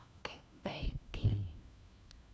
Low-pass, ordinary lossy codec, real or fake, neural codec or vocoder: none; none; fake; codec, 16 kHz, 1 kbps, FunCodec, trained on LibriTTS, 50 frames a second